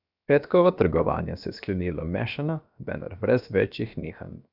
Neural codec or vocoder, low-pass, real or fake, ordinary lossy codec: codec, 16 kHz, about 1 kbps, DyCAST, with the encoder's durations; 5.4 kHz; fake; none